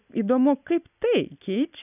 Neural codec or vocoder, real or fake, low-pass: none; real; 3.6 kHz